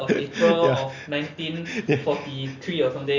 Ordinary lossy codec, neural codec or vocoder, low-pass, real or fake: Opus, 64 kbps; none; 7.2 kHz; real